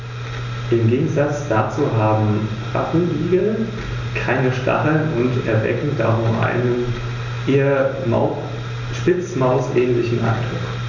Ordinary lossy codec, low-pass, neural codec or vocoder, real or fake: none; 7.2 kHz; none; real